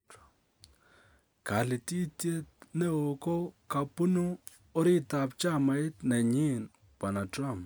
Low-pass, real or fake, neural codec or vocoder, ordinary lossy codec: none; real; none; none